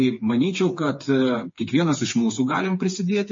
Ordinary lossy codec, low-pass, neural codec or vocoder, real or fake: MP3, 32 kbps; 7.2 kHz; codec, 16 kHz, 4 kbps, FreqCodec, smaller model; fake